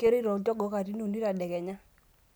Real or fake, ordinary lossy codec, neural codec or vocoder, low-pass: real; none; none; none